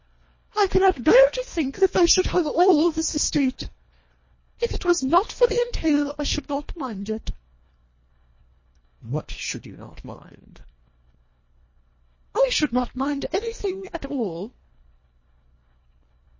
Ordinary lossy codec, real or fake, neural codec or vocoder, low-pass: MP3, 32 kbps; fake; codec, 24 kHz, 1.5 kbps, HILCodec; 7.2 kHz